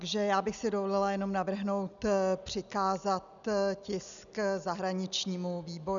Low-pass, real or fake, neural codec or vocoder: 7.2 kHz; real; none